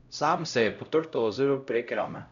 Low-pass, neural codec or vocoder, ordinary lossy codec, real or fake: 7.2 kHz; codec, 16 kHz, 0.5 kbps, X-Codec, HuBERT features, trained on LibriSpeech; none; fake